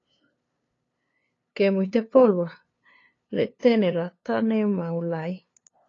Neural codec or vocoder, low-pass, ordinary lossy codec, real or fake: codec, 16 kHz, 2 kbps, FunCodec, trained on LibriTTS, 25 frames a second; 7.2 kHz; AAC, 32 kbps; fake